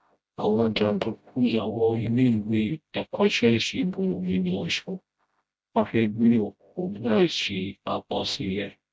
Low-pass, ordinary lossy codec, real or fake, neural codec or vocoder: none; none; fake; codec, 16 kHz, 0.5 kbps, FreqCodec, smaller model